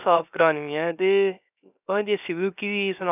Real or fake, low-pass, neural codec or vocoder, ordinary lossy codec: fake; 3.6 kHz; codec, 16 kHz, 0.3 kbps, FocalCodec; none